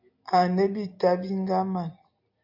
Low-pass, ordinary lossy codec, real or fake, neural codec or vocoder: 5.4 kHz; MP3, 48 kbps; real; none